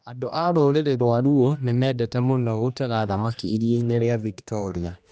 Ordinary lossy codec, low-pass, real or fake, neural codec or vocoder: none; none; fake; codec, 16 kHz, 1 kbps, X-Codec, HuBERT features, trained on general audio